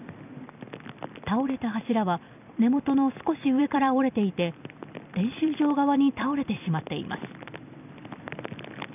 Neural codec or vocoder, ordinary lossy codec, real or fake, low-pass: vocoder, 44.1 kHz, 128 mel bands every 256 samples, BigVGAN v2; none; fake; 3.6 kHz